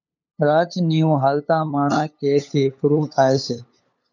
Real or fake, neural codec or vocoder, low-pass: fake; codec, 16 kHz, 8 kbps, FunCodec, trained on LibriTTS, 25 frames a second; 7.2 kHz